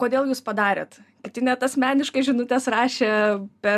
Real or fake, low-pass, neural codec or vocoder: real; 14.4 kHz; none